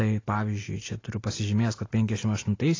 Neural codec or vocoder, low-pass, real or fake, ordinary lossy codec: none; 7.2 kHz; real; AAC, 32 kbps